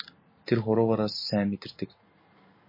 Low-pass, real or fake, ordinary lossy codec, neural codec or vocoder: 5.4 kHz; real; MP3, 24 kbps; none